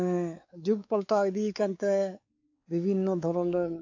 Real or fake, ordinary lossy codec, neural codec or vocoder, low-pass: fake; AAC, 48 kbps; codec, 16 kHz, 4 kbps, X-Codec, WavLM features, trained on Multilingual LibriSpeech; 7.2 kHz